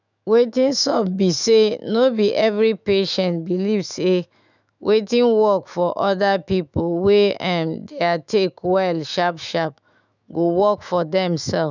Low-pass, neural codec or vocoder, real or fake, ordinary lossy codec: 7.2 kHz; autoencoder, 48 kHz, 128 numbers a frame, DAC-VAE, trained on Japanese speech; fake; none